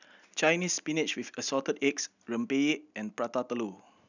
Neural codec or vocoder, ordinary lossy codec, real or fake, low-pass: none; none; real; 7.2 kHz